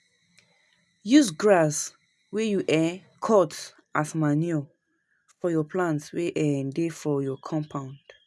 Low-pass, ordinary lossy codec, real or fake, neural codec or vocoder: none; none; real; none